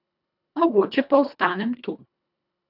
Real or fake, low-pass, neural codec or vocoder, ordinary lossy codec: fake; 5.4 kHz; codec, 24 kHz, 1.5 kbps, HILCodec; none